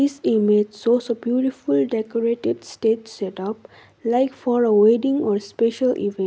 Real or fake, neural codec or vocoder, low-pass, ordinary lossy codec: real; none; none; none